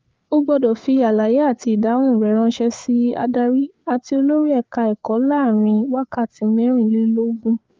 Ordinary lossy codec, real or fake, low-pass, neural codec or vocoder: Opus, 24 kbps; fake; 7.2 kHz; codec, 16 kHz, 4 kbps, FreqCodec, larger model